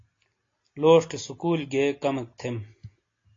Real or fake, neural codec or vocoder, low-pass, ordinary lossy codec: real; none; 7.2 kHz; AAC, 32 kbps